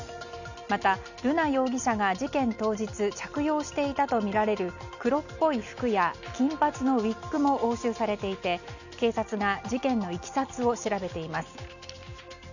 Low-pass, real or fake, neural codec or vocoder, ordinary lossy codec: 7.2 kHz; real; none; none